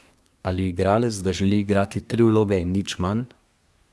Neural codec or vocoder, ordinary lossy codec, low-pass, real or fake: codec, 24 kHz, 1 kbps, SNAC; none; none; fake